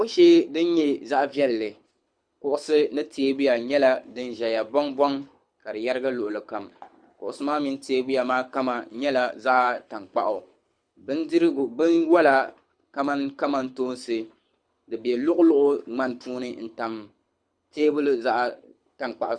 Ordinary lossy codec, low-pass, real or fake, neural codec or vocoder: Opus, 64 kbps; 9.9 kHz; fake; codec, 24 kHz, 6 kbps, HILCodec